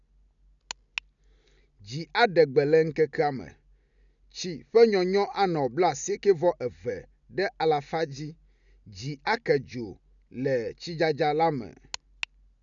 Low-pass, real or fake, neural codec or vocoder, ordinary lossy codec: 7.2 kHz; real; none; none